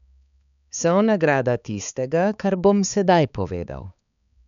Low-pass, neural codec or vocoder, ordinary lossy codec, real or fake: 7.2 kHz; codec, 16 kHz, 4 kbps, X-Codec, HuBERT features, trained on balanced general audio; none; fake